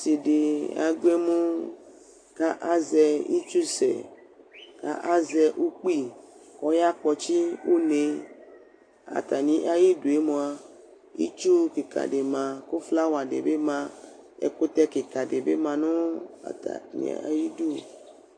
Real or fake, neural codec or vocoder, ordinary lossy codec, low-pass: real; none; AAC, 48 kbps; 9.9 kHz